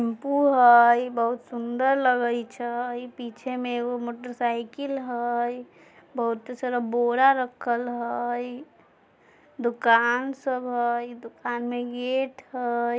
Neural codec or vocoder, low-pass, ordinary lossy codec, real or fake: none; none; none; real